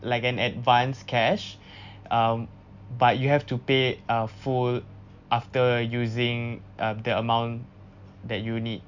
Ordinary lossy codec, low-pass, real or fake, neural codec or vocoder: none; 7.2 kHz; real; none